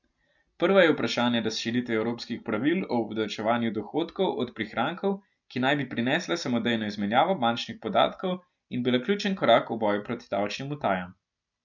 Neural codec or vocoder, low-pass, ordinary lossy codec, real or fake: none; 7.2 kHz; none; real